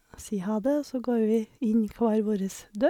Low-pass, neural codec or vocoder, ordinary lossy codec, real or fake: 19.8 kHz; none; MP3, 96 kbps; real